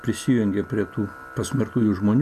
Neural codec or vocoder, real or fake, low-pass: none; real; 14.4 kHz